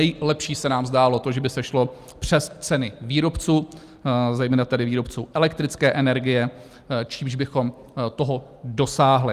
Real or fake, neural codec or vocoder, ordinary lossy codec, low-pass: real; none; Opus, 32 kbps; 14.4 kHz